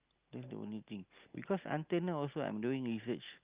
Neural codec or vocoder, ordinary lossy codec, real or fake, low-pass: none; Opus, 24 kbps; real; 3.6 kHz